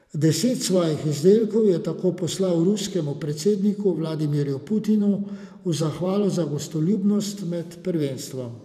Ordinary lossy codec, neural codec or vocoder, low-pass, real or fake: none; vocoder, 44.1 kHz, 128 mel bands every 512 samples, BigVGAN v2; 14.4 kHz; fake